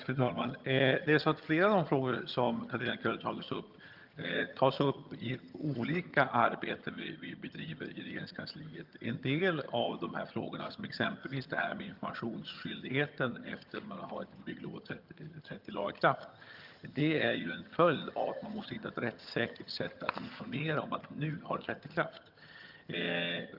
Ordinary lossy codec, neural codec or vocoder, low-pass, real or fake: Opus, 24 kbps; vocoder, 22.05 kHz, 80 mel bands, HiFi-GAN; 5.4 kHz; fake